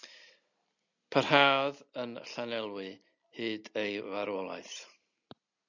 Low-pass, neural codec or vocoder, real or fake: 7.2 kHz; none; real